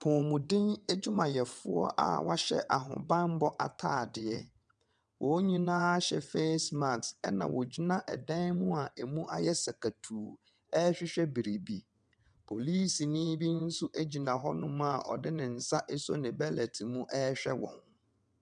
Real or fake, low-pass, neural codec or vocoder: fake; 9.9 kHz; vocoder, 22.05 kHz, 80 mel bands, WaveNeXt